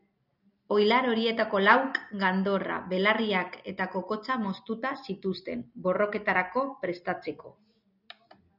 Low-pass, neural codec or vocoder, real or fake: 5.4 kHz; none; real